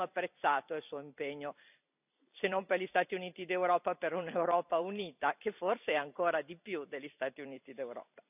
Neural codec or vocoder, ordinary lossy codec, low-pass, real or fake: vocoder, 44.1 kHz, 128 mel bands every 256 samples, BigVGAN v2; none; 3.6 kHz; fake